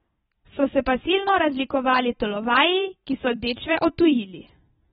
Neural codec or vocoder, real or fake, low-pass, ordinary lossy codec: vocoder, 44.1 kHz, 128 mel bands every 256 samples, BigVGAN v2; fake; 19.8 kHz; AAC, 16 kbps